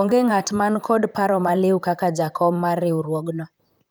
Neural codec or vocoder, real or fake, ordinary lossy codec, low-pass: vocoder, 44.1 kHz, 128 mel bands, Pupu-Vocoder; fake; none; none